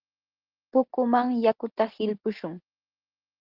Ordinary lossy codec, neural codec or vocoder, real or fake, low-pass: Opus, 16 kbps; none; real; 5.4 kHz